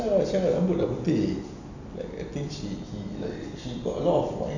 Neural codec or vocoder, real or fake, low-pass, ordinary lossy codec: none; real; 7.2 kHz; none